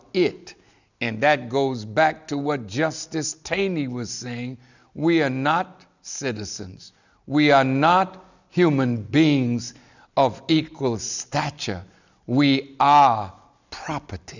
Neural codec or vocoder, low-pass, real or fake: none; 7.2 kHz; real